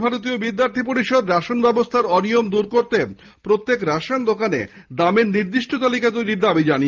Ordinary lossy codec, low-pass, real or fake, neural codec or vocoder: Opus, 32 kbps; 7.2 kHz; real; none